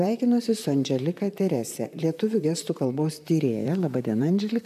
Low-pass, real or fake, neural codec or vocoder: 14.4 kHz; fake; vocoder, 44.1 kHz, 128 mel bands, Pupu-Vocoder